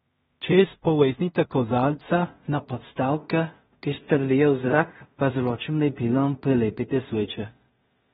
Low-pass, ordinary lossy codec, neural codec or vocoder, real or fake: 10.8 kHz; AAC, 16 kbps; codec, 16 kHz in and 24 kHz out, 0.4 kbps, LongCat-Audio-Codec, two codebook decoder; fake